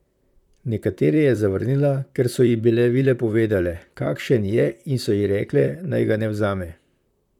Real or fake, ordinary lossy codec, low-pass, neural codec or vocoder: fake; none; 19.8 kHz; vocoder, 44.1 kHz, 128 mel bands, Pupu-Vocoder